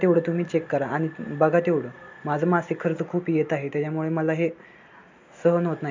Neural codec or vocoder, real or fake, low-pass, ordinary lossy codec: none; real; 7.2 kHz; MP3, 64 kbps